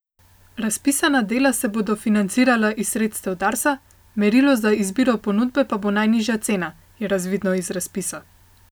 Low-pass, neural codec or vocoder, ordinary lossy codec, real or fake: none; none; none; real